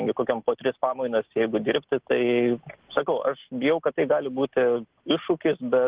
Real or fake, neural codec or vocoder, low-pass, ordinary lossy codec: real; none; 3.6 kHz; Opus, 16 kbps